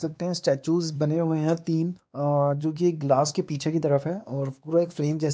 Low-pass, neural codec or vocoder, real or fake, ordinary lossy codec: none; codec, 16 kHz, 2 kbps, X-Codec, WavLM features, trained on Multilingual LibriSpeech; fake; none